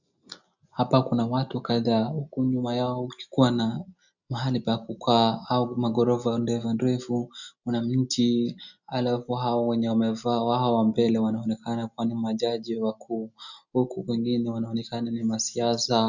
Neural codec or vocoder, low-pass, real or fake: none; 7.2 kHz; real